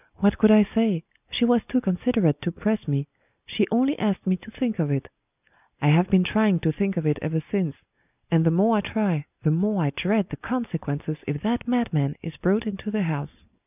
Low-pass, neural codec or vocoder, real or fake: 3.6 kHz; none; real